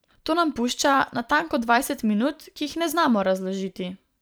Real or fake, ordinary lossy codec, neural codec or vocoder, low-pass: fake; none; vocoder, 44.1 kHz, 128 mel bands every 512 samples, BigVGAN v2; none